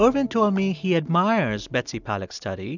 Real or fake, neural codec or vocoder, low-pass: real; none; 7.2 kHz